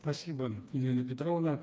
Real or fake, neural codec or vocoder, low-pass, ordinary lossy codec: fake; codec, 16 kHz, 2 kbps, FreqCodec, smaller model; none; none